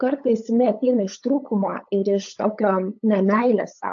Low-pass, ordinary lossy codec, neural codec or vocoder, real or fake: 7.2 kHz; AAC, 64 kbps; codec, 16 kHz, 8 kbps, FunCodec, trained on LibriTTS, 25 frames a second; fake